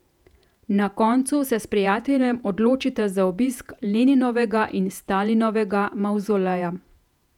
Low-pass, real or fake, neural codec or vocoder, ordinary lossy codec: 19.8 kHz; fake; vocoder, 48 kHz, 128 mel bands, Vocos; none